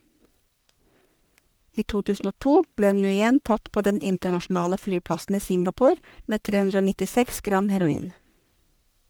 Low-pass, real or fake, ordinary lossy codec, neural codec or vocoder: none; fake; none; codec, 44.1 kHz, 1.7 kbps, Pupu-Codec